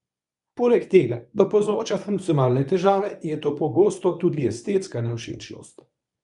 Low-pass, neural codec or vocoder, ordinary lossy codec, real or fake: 10.8 kHz; codec, 24 kHz, 0.9 kbps, WavTokenizer, medium speech release version 1; none; fake